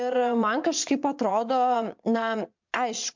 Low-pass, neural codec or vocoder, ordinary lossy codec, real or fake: 7.2 kHz; vocoder, 22.05 kHz, 80 mel bands, Vocos; MP3, 64 kbps; fake